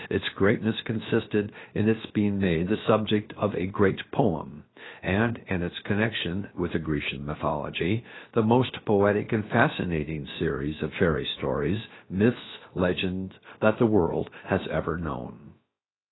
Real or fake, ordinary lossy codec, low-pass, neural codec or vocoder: fake; AAC, 16 kbps; 7.2 kHz; codec, 16 kHz, about 1 kbps, DyCAST, with the encoder's durations